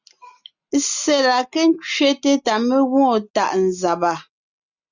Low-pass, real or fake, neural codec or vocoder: 7.2 kHz; real; none